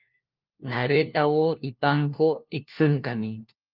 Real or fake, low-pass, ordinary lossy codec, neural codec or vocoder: fake; 5.4 kHz; Opus, 32 kbps; codec, 16 kHz, 1 kbps, FunCodec, trained on LibriTTS, 50 frames a second